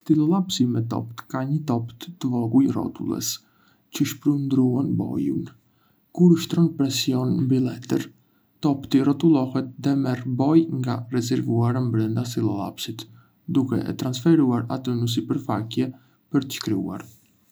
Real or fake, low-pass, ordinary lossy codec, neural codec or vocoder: real; none; none; none